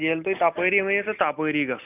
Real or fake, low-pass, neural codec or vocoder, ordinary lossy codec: real; 3.6 kHz; none; none